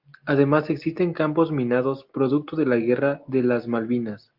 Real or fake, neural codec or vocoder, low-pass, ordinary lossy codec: real; none; 5.4 kHz; Opus, 24 kbps